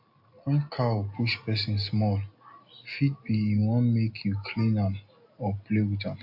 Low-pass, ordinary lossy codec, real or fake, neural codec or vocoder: 5.4 kHz; AAC, 48 kbps; real; none